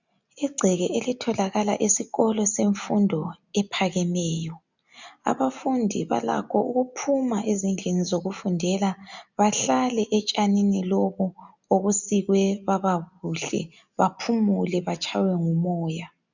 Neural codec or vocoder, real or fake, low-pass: none; real; 7.2 kHz